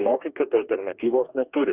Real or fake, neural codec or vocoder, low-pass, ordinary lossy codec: fake; codec, 44.1 kHz, 2.6 kbps, DAC; 3.6 kHz; Opus, 64 kbps